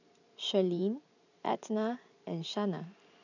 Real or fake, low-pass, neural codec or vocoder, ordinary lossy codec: fake; 7.2 kHz; vocoder, 44.1 kHz, 128 mel bands every 512 samples, BigVGAN v2; none